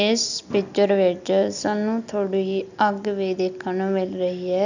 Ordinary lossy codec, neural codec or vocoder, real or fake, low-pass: none; none; real; 7.2 kHz